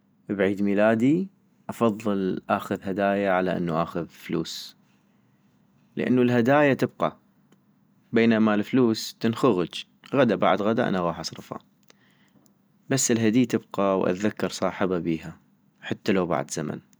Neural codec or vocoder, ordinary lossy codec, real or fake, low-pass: none; none; real; none